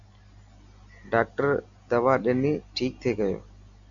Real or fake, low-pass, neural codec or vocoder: real; 7.2 kHz; none